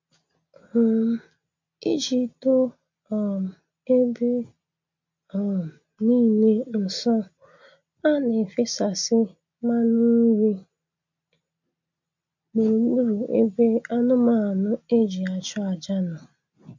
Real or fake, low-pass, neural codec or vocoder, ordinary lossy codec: real; 7.2 kHz; none; MP3, 64 kbps